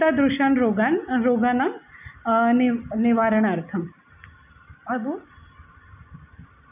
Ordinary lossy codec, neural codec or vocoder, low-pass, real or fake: none; none; 3.6 kHz; real